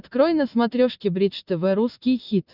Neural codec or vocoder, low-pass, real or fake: none; 5.4 kHz; real